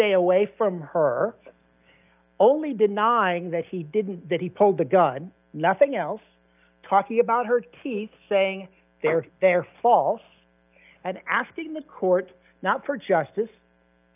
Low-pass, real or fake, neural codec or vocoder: 3.6 kHz; real; none